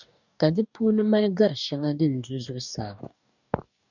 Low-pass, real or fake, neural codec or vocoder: 7.2 kHz; fake; codec, 44.1 kHz, 2.6 kbps, DAC